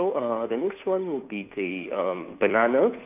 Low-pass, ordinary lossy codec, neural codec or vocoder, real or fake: 3.6 kHz; none; codec, 16 kHz, 2 kbps, FunCodec, trained on Chinese and English, 25 frames a second; fake